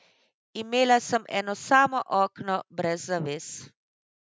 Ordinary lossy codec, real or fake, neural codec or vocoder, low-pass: none; real; none; none